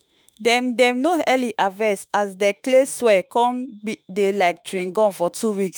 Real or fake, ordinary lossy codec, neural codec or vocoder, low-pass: fake; none; autoencoder, 48 kHz, 32 numbers a frame, DAC-VAE, trained on Japanese speech; none